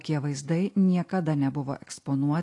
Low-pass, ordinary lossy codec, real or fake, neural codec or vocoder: 10.8 kHz; AAC, 48 kbps; real; none